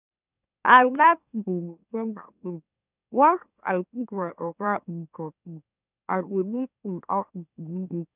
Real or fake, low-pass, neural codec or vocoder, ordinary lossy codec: fake; 3.6 kHz; autoencoder, 44.1 kHz, a latent of 192 numbers a frame, MeloTTS; none